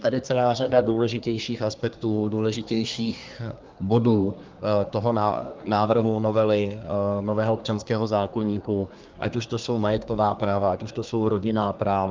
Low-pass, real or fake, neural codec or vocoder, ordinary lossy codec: 7.2 kHz; fake; codec, 24 kHz, 1 kbps, SNAC; Opus, 24 kbps